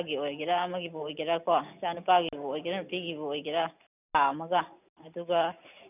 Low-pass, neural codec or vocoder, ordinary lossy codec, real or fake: 3.6 kHz; none; none; real